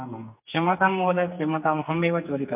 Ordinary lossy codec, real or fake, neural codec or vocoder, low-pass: MP3, 32 kbps; fake; codec, 44.1 kHz, 2.6 kbps, SNAC; 3.6 kHz